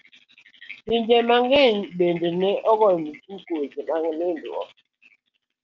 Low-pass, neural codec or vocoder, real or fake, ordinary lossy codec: 7.2 kHz; none; real; Opus, 24 kbps